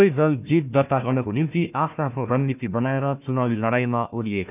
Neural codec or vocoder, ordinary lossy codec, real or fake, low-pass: codec, 16 kHz, 1 kbps, FunCodec, trained on Chinese and English, 50 frames a second; none; fake; 3.6 kHz